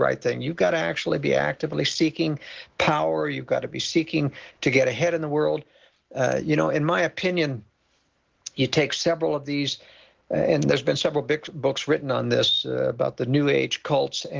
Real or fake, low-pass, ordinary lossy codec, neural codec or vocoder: real; 7.2 kHz; Opus, 16 kbps; none